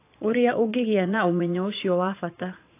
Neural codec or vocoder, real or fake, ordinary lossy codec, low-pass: vocoder, 22.05 kHz, 80 mel bands, Vocos; fake; AAC, 24 kbps; 3.6 kHz